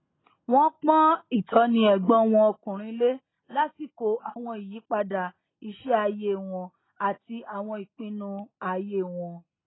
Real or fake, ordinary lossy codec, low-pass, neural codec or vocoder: real; AAC, 16 kbps; 7.2 kHz; none